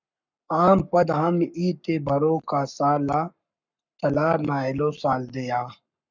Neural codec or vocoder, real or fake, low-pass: codec, 44.1 kHz, 7.8 kbps, Pupu-Codec; fake; 7.2 kHz